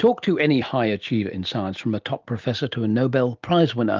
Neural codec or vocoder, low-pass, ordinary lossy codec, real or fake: none; 7.2 kHz; Opus, 32 kbps; real